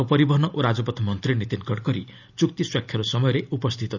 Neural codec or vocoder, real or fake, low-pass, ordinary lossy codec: none; real; 7.2 kHz; none